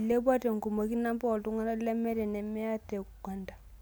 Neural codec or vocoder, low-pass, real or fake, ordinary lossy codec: none; none; real; none